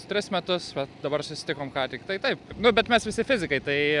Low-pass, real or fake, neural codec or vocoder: 10.8 kHz; real; none